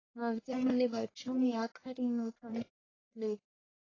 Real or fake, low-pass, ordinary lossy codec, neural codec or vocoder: fake; 7.2 kHz; AAC, 32 kbps; codec, 44.1 kHz, 1.7 kbps, Pupu-Codec